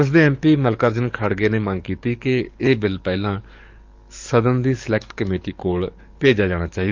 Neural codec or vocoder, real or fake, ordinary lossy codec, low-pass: codec, 44.1 kHz, 7.8 kbps, DAC; fake; Opus, 24 kbps; 7.2 kHz